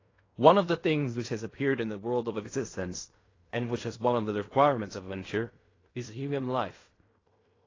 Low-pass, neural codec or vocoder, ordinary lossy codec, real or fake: 7.2 kHz; codec, 16 kHz in and 24 kHz out, 0.4 kbps, LongCat-Audio-Codec, fine tuned four codebook decoder; AAC, 32 kbps; fake